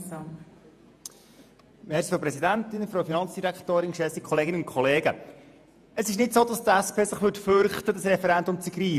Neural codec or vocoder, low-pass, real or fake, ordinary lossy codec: vocoder, 48 kHz, 128 mel bands, Vocos; 14.4 kHz; fake; none